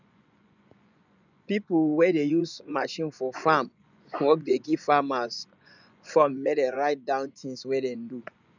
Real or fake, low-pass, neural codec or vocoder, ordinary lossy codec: fake; 7.2 kHz; vocoder, 24 kHz, 100 mel bands, Vocos; none